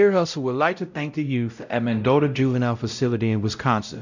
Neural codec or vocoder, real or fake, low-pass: codec, 16 kHz, 0.5 kbps, X-Codec, WavLM features, trained on Multilingual LibriSpeech; fake; 7.2 kHz